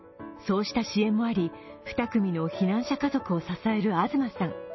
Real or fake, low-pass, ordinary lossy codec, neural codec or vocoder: real; 7.2 kHz; MP3, 24 kbps; none